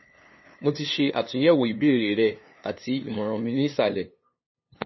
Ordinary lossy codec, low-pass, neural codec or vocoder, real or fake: MP3, 24 kbps; 7.2 kHz; codec, 16 kHz, 2 kbps, FunCodec, trained on LibriTTS, 25 frames a second; fake